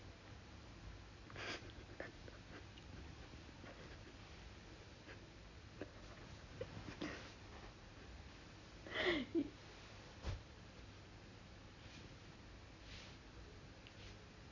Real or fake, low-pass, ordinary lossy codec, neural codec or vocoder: real; 7.2 kHz; none; none